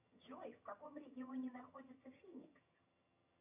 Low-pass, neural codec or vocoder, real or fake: 3.6 kHz; vocoder, 22.05 kHz, 80 mel bands, HiFi-GAN; fake